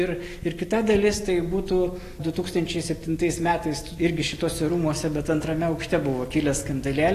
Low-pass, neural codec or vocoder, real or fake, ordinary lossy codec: 14.4 kHz; none; real; AAC, 48 kbps